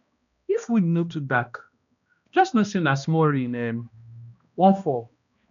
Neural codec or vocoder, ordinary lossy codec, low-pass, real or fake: codec, 16 kHz, 1 kbps, X-Codec, HuBERT features, trained on balanced general audio; none; 7.2 kHz; fake